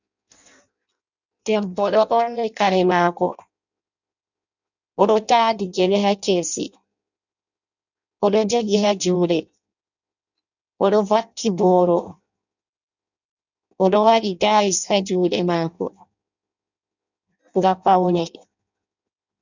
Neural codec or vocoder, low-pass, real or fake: codec, 16 kHz in and 24 kHz out, 0.6 kbps, FireRedTTS-2 codec; 7.2 kHz; fake